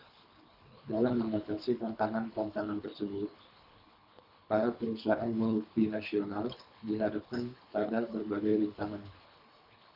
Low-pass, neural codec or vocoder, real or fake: 5.4 kHz; codec, 24 kHz, 3 kbps, HILCodec; fake